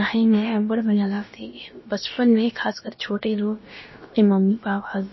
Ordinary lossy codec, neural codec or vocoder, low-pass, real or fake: MP3, 24 kbps; codec, 16 kHz, about 1 kbps, DyCAST, with the encoder's durations; 7.2 kHz; fake